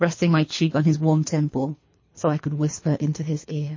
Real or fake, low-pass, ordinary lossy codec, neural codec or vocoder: fake; 7.2 kHz; MP3, 32 kbps; codec, 24 kHz, 3 kbps, HILCodec